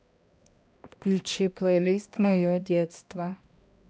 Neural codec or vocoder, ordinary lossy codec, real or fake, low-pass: codec, 16 kHz, 1 kbps, X-Codec, HuBERT features, trained on balanced general audio; none; fake; none